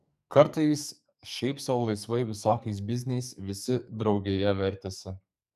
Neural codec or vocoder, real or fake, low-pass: codec, 44.1 kHz, 2.6 kbps, SNAC; fake; 14.4 kHz